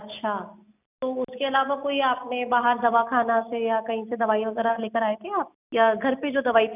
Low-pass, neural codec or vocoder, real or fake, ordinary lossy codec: 3.6 kHz; none; real; none